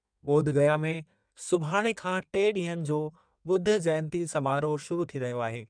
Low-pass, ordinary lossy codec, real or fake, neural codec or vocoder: 9.9 kHz; none; fake; codec, 16 kHz in and 24 kHz out, 1.1 kbps, FireRedTTS-2 codec